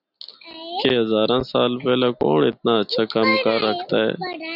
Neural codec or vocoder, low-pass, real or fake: none; 5.4 kHz; real